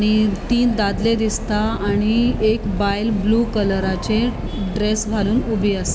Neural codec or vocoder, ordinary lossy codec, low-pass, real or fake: none; none; none; real